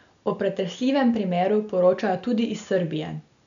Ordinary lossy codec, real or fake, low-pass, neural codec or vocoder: none; real; 7.2 kHz; none